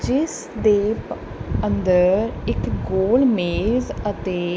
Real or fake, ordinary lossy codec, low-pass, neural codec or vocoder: real; none; none; none